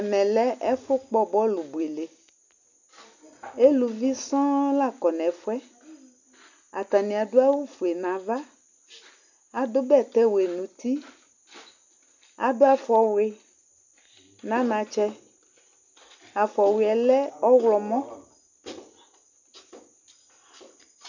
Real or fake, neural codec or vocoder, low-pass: real; none; 7.2 kHz